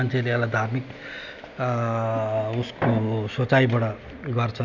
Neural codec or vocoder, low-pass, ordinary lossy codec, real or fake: none; 7.2 kHz; none; real